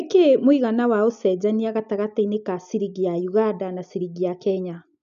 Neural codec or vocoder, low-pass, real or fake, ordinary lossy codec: none; 7.2 kHz; real; none